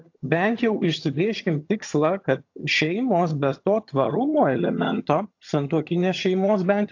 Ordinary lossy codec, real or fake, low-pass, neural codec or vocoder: AAC, 48 kbps; fake; 7.2 kHz; vocoder, 22.05 kHz, 80 mel bands, HiFi-GAN